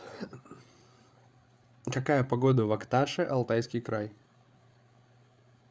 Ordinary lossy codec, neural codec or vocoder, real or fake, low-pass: none; codec, 16 kHz, 8 kbps, FreqCodec, larger model; fake; none